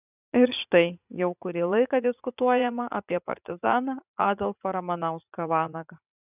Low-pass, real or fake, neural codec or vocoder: 3.6 kHz; fake; vocoder, 44.1 kHz, 80 mel bands, Vocos